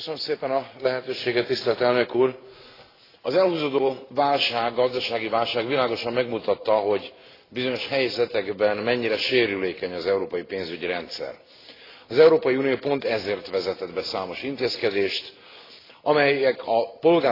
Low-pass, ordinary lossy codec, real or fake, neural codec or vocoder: 5.4 kHz; AAC, 24 kbps; real; none